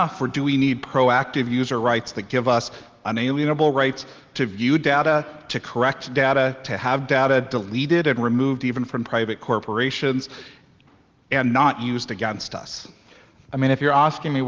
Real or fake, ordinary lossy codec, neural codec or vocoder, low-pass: real; Opus, 32 kbps; none; 7.2 kHz